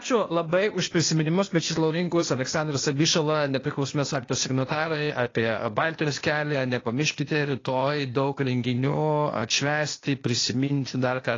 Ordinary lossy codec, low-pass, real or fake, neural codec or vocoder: AAC, 32 kbps; 7.2 kHz; fake; codec, 16 kHz, 0.8 kbps, ZipCodec